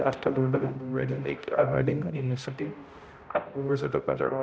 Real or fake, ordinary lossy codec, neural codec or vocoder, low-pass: fake; none; codec, 16 kHz, 0.5 kbps, X-Codec, HuBERT features, trained on balanced general audio; none